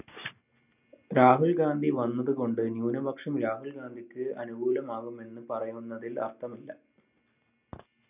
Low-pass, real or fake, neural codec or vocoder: 3.6 kHz; real; none